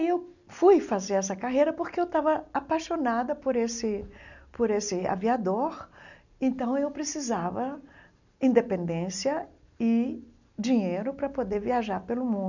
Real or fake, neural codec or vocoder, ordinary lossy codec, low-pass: real; none; none; 7.2 kHz